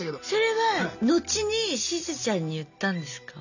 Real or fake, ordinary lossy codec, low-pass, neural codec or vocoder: real; none; 7.2 kHz; none